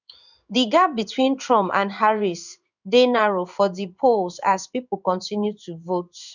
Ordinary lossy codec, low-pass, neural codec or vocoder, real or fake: none; 7.2 kHz; codec, 16 kHz in and 24 kHz out, 1 kbps, XY-Tokenizer; fake